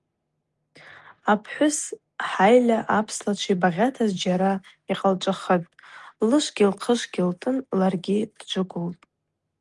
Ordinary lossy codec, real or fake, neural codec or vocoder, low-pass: Opus, 24 kbps; real; none; 10.8 kHz